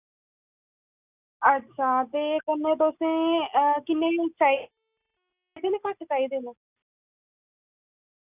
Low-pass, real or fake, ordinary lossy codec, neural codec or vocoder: 3.6 kHz; real; none; none